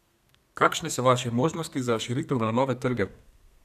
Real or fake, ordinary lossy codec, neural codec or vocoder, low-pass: fake; none; codec, 32 kHz, 1.9 kbps, SNAC; 14.4 kHz